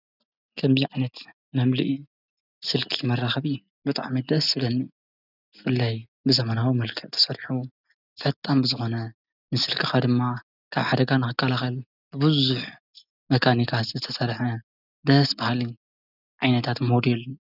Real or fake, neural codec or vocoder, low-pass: real; none; 5.4 kHz